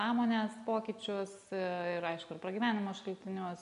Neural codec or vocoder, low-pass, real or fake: none; 10.8 kHz; real